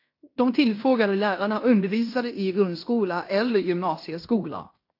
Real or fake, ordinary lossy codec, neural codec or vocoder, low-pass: fake; AAC, 32 kbps; codec, 16 kHz in and 24 kHz out, 0.9 kbps, LongCat-Audio-Codec, fine tuned four codebook decoder; 5.4 kHz